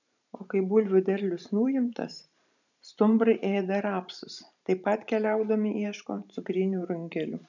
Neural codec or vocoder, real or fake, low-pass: vocoder, 44.1 kHz, 128 mel bands every 512 samples, BigVGAN v2; fake; 7.2 kHz